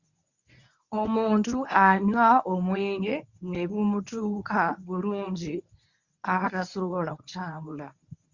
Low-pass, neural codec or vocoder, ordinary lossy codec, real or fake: 7.2 kHz; codec, 24 kHz, 0.9 kbps, WavTokenizer, medium speech release version 1; Opus, 64 kbps; fake